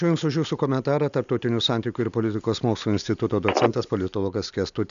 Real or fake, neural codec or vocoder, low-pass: real; none; 7.2 kHz